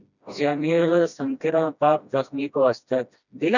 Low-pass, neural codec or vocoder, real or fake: 7.2 kHz; codec, 16 kHz, 1 kbps, FreqCodec, smaller model; fake